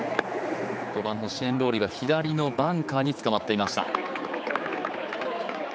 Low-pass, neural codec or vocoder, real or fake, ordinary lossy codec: none; codec, 16 kHz, 4 kbps, X-Codec, HuBERT features, trained on general audio; fake; none